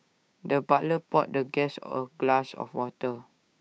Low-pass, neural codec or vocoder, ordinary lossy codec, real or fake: none; codec, 16 kHz, 6 kbps, DAC; none; fake